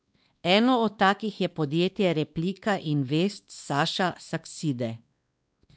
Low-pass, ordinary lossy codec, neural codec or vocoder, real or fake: none; none; codec, 16 kHz, 2 kbps, X-Codec, WavLM features, trained on Multilingual LibriSpeech; fake